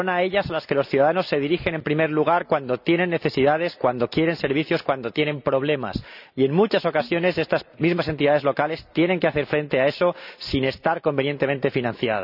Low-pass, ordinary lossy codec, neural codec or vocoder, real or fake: 5.4 kHz; none; none; real